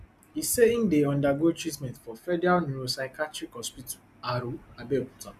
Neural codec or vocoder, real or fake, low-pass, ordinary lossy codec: none; real; 14.4 kHz; none